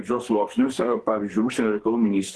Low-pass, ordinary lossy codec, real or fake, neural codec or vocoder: 10.8 kHz; Opus, 16 kbps; fake; codec, 44.1 kHz, 2.6 kbps, SNAC